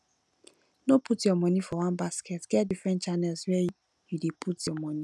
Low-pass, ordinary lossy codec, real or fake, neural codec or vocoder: none; none; real; none